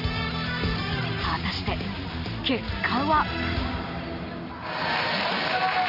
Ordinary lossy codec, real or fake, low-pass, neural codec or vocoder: none; real; 5.4 kHz; none